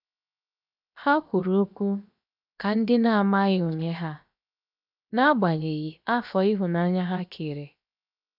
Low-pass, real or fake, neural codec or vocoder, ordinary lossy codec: 5.4 kHz; fake; codec, 16 kHz, 0.7 kbps, FocalCodec; none